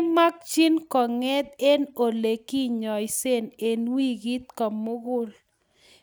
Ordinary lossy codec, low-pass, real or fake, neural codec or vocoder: none; none; real; none